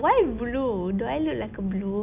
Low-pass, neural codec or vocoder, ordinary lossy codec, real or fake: 3.6 kHz; none; none; real